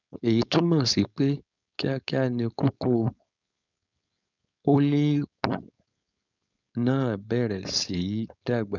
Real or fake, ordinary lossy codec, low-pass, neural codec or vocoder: fake; none; 7.2 kHz; codec, 16 kHz, 4.8 kbps, FACodec